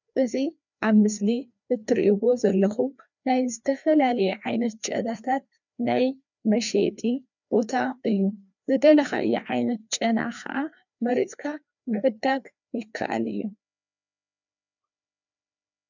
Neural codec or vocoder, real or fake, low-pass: codec, 16 kHz, 2 kbps, FreqCodec, larger model; fake; 7.2 kHz